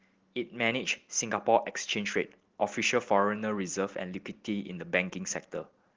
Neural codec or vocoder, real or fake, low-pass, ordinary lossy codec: none; real; 7.2 kHz; Opus, 16 kbps